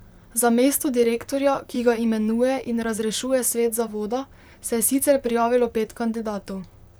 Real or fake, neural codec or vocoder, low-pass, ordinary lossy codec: fake; vocoder, 44.1 kHz, 128 mel bands, Pupu-Vocoder; none; none